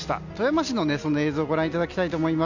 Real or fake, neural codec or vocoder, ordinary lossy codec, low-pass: real; none; none; 7.2 kHz